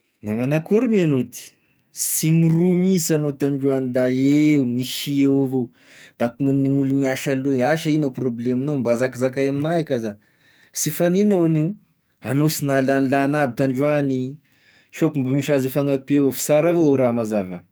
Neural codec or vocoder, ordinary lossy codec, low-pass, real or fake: codec, 44.1 kHz, 2.6 kbps, SNAC; none; none; fake